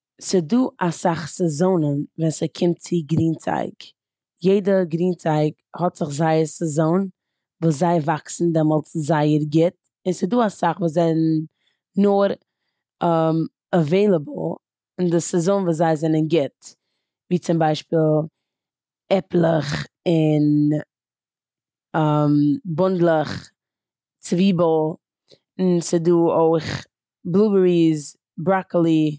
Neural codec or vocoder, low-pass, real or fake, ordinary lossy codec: none; none; real; none